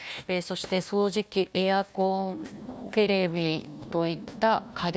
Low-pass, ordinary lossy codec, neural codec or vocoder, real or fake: none; none; codec, 16 kHz, 1 kbps, FunCodec, trained on Chinese and English, 50 frames a second; fake